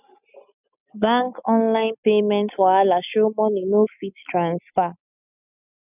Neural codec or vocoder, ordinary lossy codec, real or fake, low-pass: none; none; real; 3.6 kHz